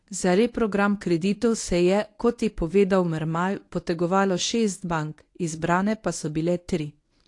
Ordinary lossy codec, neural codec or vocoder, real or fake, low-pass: AAC, 48 kbps; codec, 24 kHz, 0.9 kbps, WavTokenizer, medium speech release version 1; fake; 10.8 kHz